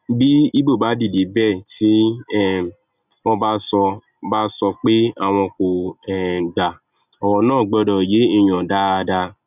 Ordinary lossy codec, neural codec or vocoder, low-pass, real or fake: none; none; 3.6 kHz; real